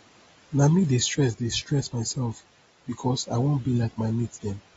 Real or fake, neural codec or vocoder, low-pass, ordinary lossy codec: real; none; 19.8 kHz; AAC, 24 kbps